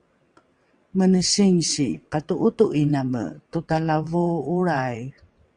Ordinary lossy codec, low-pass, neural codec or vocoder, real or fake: Opus, 64 kbps; 9.9 kHz; vocoder, 22.05 kHz, 80 mel bands, WaveNeXt; fake